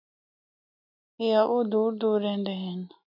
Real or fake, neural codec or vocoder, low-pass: real; none; 5.4 kHz